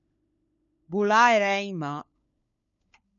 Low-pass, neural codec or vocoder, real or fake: 7.2 kHz; codec, 16 kHz, 4 kbps, FunCodec, trained on LibriTTS, 50 frames a second; fake